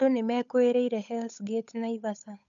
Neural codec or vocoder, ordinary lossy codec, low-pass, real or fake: codec, 16 kHz, 8 kbps, FunCodec, trained on Chinese and English, 25 frames a second; none; 7.2 kHz; fake